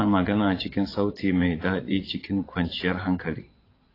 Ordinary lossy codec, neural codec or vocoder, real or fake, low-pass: AAC, 24 kbps; vocoder, 22.05 kHz, 80 mel bands, Vocos; fake; 5.4 kHz